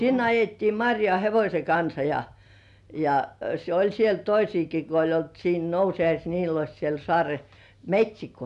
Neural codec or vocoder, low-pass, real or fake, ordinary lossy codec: none; 10.8 kHz; real; none